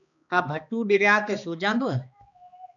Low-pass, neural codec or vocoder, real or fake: 7.2 kHz; codec, 16 kHz, 2 kbps, X-Codec, HuBERT features, trained on balanced general audio; fake